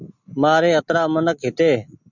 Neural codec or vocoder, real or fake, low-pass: none; real; 7.2 kHz